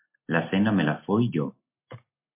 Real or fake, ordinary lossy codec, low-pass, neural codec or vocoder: real; MP3, 24 kbps; 3.6 kHz; none